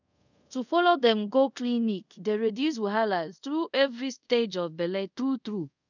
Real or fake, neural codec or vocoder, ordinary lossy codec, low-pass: fake; codec, 24 kHz, 0.5 kbps, DualCodec; none; 7.2 kHz